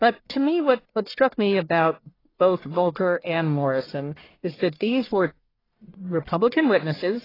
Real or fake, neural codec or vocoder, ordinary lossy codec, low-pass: fake; codec, 44.1 kHz, 1.7 kbps, Pupu-Codec; AAC, 24 kbps; 5.4 kHz